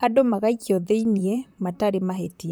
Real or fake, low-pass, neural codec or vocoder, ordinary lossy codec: fake; none; vocoder, 44.1 kHz, 128 mel bands, Pupu-Vocoder; none